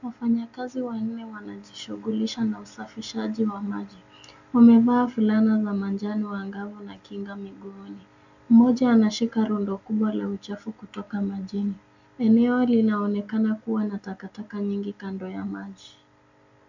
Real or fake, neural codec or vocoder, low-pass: real; none; 7.2 kHz